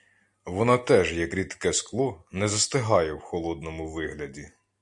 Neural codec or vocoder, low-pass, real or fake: none; 10.8 kHz; real